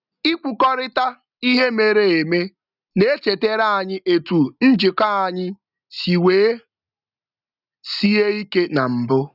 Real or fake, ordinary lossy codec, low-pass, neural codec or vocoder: real; none; 5.4 kHz; none